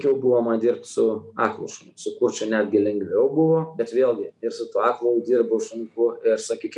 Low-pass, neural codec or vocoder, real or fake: 10.8 kHz; none; real